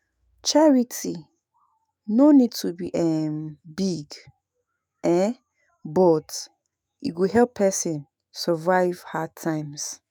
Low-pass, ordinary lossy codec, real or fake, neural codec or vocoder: none; none; fake; autoencoder, 48 kHz, 128 numbers a frame, DAC-VAE, trained on Japanese speech